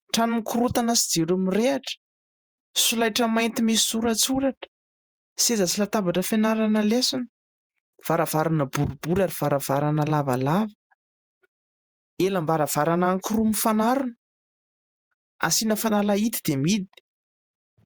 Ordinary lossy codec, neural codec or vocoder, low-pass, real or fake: Opus, 64 kbps; vocoder, 48 kHz, 128 mel bands, Vocos; 19.8 kHz; fake